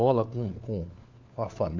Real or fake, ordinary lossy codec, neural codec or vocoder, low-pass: fake; MP3, 64 kbps; codec, 16 kHz, 4 kbps, FunCodec, trained on LibriTTS, 50 frames a second; 7.2 kHz